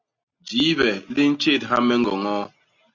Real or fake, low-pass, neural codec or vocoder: real; 7.2 kHz; none